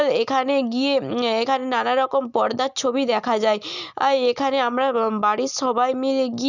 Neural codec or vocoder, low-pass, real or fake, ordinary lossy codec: none; 7.2 kHz; real; none